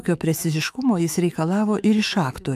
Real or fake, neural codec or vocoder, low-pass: fake; autoencoder, 48 kHz, 128 numbers a frame, DAC-VAE, trained on Japanese speech; 14.4 kHz